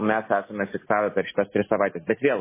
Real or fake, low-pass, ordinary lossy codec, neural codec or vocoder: real; 3.6 kHz; MP3, 16 kbps; none